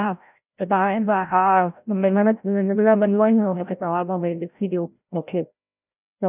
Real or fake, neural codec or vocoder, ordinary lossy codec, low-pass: fake; codec, 16 kHz, 0.5 kbps, FreqCodec, larger model; none; 3.6 kHz